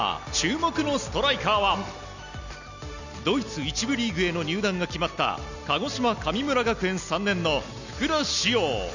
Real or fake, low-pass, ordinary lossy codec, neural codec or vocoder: real; 7.2 kHz; none; none